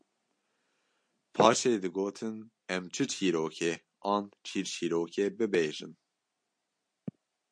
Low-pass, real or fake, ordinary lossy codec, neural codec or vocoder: 9.9 kHz; real; MP3, 48 kbps; none